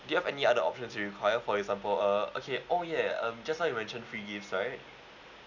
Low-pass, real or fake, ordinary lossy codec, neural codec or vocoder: 7.2 kHz; real; none; none